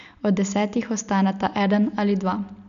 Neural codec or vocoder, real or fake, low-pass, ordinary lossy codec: none; real; 7.2 kHz; none